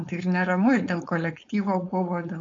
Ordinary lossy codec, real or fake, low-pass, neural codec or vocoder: AAC, 48 kbps; fake; 7.2 kHz; codec, 16 kHz, 4.8 kbps, FACodec